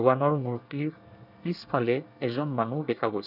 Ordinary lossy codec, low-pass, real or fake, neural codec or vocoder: none; 5.4 kHz; fake; codec, 24 kHz, 1 kbps, SNAC